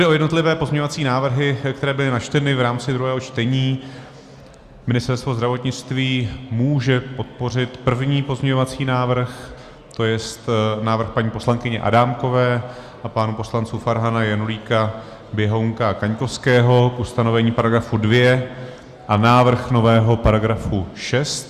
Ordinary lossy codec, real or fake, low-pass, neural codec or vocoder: Opus, 64 kbps; real; 14.4 kHz; none